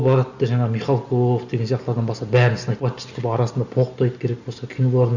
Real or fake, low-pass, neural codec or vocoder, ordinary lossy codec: real; 7.2 kHz; none; none